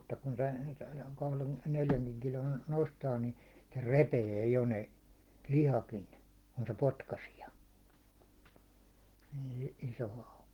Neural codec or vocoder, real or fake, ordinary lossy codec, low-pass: none; real; Opus, 16 kbps; 19.8 kHz